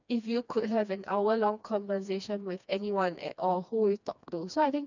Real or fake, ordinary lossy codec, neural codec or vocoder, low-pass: fake; none; codec, 16 kHz, 2 kbps, FreqCodec, smaller model; 7.2 kHz